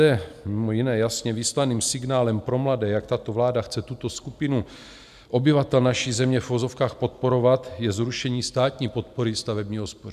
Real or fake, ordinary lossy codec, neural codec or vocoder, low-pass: real; MP3, 96 kbps; none; 14.4 kHz